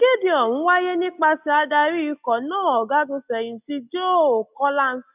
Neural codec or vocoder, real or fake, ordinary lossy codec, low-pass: none; real; none; 3.6 kHz